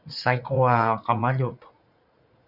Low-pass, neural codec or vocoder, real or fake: 5.4 kHz; vocoder, 44.1 kHz, 80 mel bands, Vocos; fake